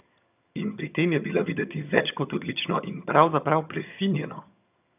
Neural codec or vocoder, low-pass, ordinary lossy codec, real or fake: vocoder, 22.05 kHz, 80 mel bands, HiFi-GAN; 3.6 kHz; none; fake